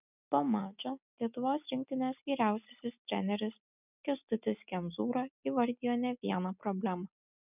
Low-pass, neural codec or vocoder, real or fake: 3.6 kHz; none; real